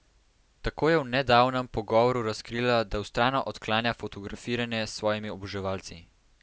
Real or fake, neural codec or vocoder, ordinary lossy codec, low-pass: real; none; none; none